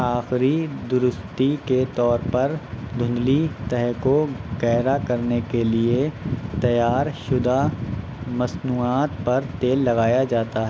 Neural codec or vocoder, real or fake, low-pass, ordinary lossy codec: none; real; none; none